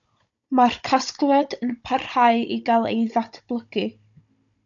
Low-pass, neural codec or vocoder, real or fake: 7.2 kHz; codec, 16 kHz, 16 kbps, FunCodec, trained on Chinese and English, 50 frames a second; fake